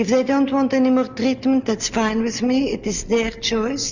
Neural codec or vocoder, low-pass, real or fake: none; 7.2 kHz; real